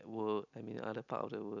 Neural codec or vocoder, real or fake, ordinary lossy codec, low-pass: none; real; none; 7.2 kHz